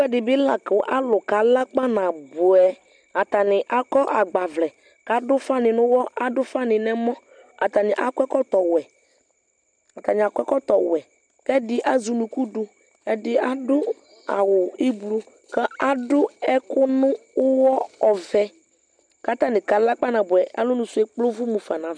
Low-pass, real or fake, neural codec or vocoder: 9.9 kHz; real; none